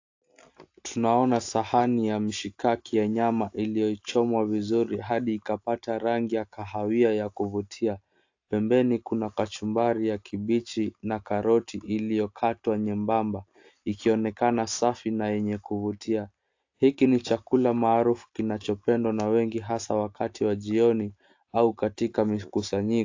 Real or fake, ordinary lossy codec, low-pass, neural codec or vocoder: real; AAC, 48 kbps; 7.2 kHz; none